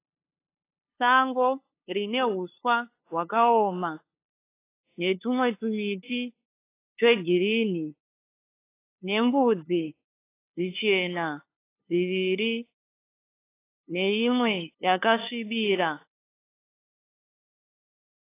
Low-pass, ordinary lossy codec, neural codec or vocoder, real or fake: 3.6 kHz; AAC, 24 kbps; codec, 16 kHz, 2 kbps, FunCodec, trained on LibriTTS, 25 frames a second; fake